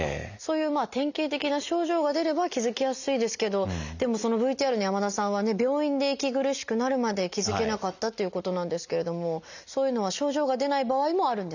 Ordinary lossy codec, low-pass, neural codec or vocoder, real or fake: none; 7.2 kHz; none; real